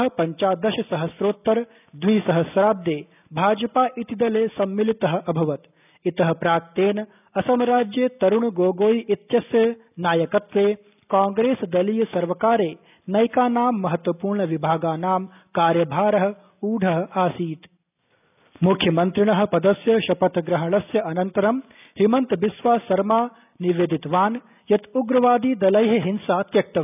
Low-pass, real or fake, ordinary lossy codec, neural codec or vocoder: 3.6 kHz; real; none; none